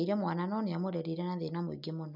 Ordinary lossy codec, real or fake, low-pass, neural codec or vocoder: none; real; 5.4 kHz; none